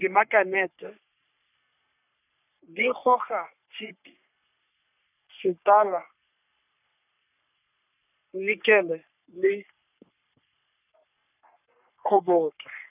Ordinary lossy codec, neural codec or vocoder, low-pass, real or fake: none; codec, 44.1 kHz, 3.4 kbps, Pupu-Codec; 3.6 kHz; fake